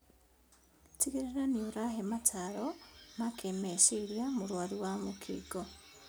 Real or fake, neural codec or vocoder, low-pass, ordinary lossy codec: real; none; none; none